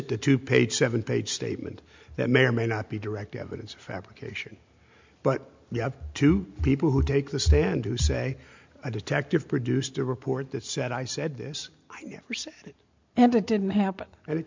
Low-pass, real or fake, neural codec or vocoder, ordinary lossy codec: 7.2 kHz; real; none; MP3, 64 kbps